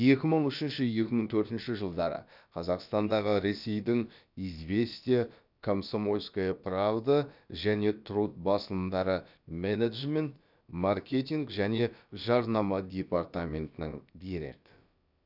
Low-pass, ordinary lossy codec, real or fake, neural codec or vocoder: 5.4 kHz; none; fake; codec, 16 kHz, about 1 kbps, DyCAST, with the encoder's durations